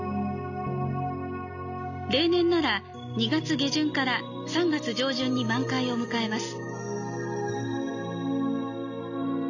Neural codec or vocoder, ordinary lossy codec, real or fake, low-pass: none; none; real; 7.2 kHz